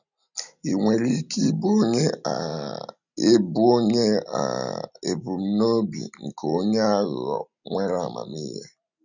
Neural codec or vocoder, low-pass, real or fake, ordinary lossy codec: none; 7.2 kHz; real; none